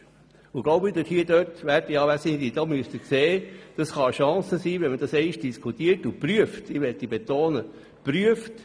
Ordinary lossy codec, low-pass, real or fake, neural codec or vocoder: none; none; real; none